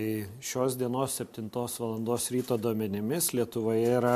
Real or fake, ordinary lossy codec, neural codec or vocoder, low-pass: real; MP3, 64 kbps; none; 14.4 kHz